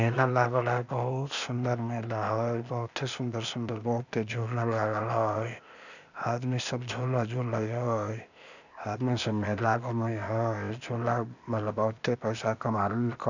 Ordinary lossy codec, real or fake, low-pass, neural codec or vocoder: none; fake; 7.2 kHz; codec, 16 kHz, 0.8 kbps, ZipCodec